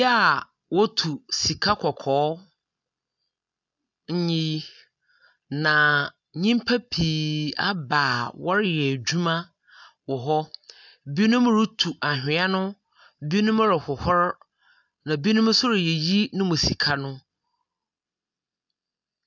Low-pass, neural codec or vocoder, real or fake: 7.2 kHz; none; real